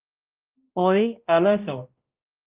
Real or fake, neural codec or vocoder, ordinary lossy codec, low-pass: fake; codec, 16 kHz, 0.5 kbps, X-Codec, HuBERT features, trained on balanced general audio; Opus, 24 kbps; 3.6 kHz